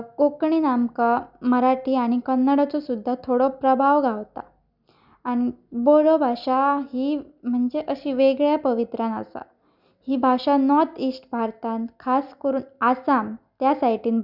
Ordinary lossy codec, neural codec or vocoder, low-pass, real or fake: none; none; 5.4 kHz; real